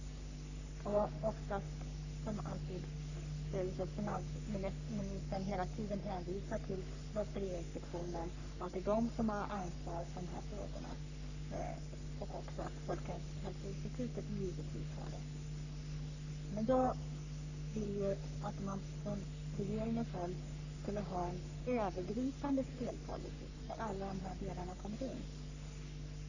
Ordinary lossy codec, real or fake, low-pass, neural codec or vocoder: none; fake; 7.2 kHz; codec, 44.1 kHz, 3.4 kbps, Pupu-Codec